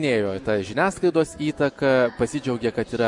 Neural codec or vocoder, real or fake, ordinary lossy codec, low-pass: none; real; MP3, 64 kbps; 10.8 kHz